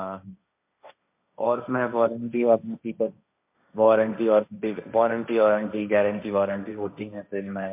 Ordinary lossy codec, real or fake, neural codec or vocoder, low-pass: MP3, 32 kbps; fake; codec, 16 kHz, 1.1 kbps, Voila-Tokenizer; 3.6 kHz